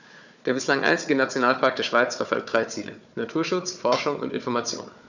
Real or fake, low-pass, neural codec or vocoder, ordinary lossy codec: fake; 7.2 kHz; codec, 16 kHz, 4 kbps, FunCodec, trained on Chinese and English, 50 frames a second; none